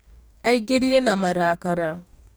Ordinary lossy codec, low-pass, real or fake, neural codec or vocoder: none; none; fake; codec, 44.1 kHz, 2.6 kbps, DAC